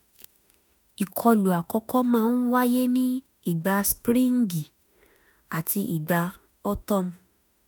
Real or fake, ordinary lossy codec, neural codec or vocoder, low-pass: fake; none; autoencoder, 48 kHz, 32 numbers a frame, DAC-VAE, trained on Japanese speech; none